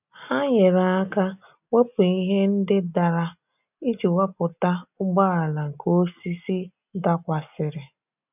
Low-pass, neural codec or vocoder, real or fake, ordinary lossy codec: 3.6 kHz; none; real; none